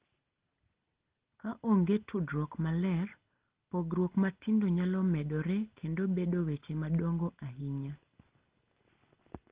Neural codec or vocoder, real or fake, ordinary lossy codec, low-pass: none; real; Opus, 16 kbps; 3.6 kHz